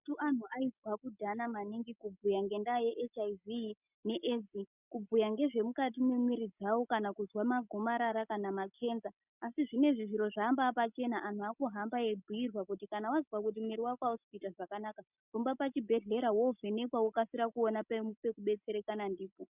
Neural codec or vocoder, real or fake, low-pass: none; real; 3.6 kHz